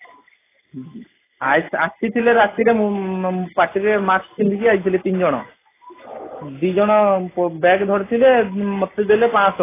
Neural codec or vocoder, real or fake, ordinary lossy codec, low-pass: none; real; AAC, 16 kbps; 3.6 kHz